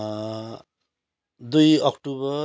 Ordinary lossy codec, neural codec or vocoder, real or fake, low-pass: none; none; real; none